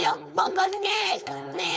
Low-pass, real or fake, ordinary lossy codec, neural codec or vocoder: none; fake; none; codec, 16 kHz, 4.8 kbps, FACodec